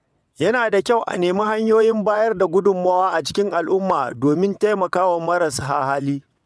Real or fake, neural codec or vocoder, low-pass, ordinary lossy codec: fake; vocoder, 22.05 kHz, 80 mel bands, WaveNeXt; none; none